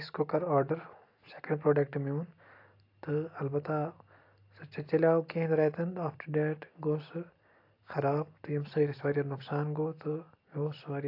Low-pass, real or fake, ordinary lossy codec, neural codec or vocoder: 5.4 kHz; real; AAC, 32 kbps; none